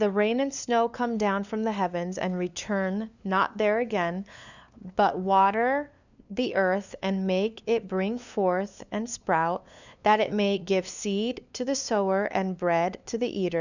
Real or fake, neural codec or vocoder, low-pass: fake; codec, 16 kHz, 2 kbps, FunCodec, trained on LibriTTS, 25 frames a second; 7.2 kHz